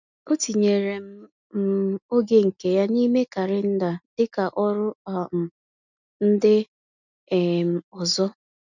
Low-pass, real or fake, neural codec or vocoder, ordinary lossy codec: 7.2 kHz; real; none; none